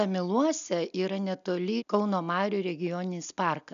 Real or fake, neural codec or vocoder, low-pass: real; none; 7.2 kHz